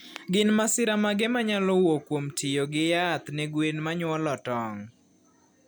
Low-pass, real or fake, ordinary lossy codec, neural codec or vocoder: none; real; none; none